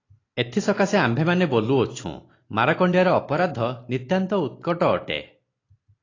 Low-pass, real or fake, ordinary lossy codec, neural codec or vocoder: 7.2 kHz; real; AAC, 32 kbps; none